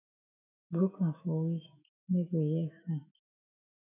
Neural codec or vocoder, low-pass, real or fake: autoencoder, 48 kHz, 128 numbers a frame, DAC-VAE, trained on Japanese speech; 3.6 kHz; fake